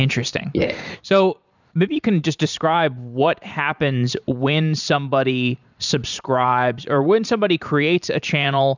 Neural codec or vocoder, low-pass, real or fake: vocoder, 44.1 kHz, 128 mel bands every 512 samples, BigVGAN v2; 7.2 kHz; fake